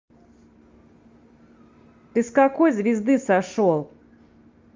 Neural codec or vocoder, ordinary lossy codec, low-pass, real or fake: none; Opus, 32 kbps; 7.2 kHz; real